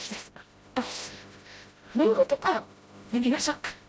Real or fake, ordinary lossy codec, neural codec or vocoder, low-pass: fake; none; codec, 16 kHz, 0.5 kbps, FreqCodec, smaller model; none